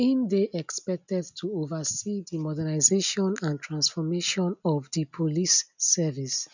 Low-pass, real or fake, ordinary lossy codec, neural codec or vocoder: 7.2 kHz; real; none; none